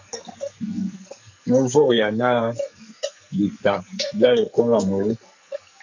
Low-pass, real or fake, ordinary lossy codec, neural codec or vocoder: 7.2 kHz; fake; MP3, 48 kbps; codec, 44.1 kHz, 2.6 kbps, SNAC